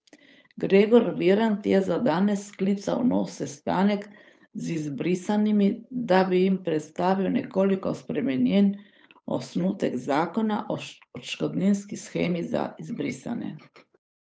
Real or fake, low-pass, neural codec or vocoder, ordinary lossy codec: fake; none; codec, 16 kHz, 8 kbps, FunCodec, trained on Chinese and English, 25 frames a second; none